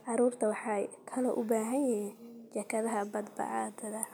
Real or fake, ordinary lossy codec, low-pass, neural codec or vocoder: real; none; none; none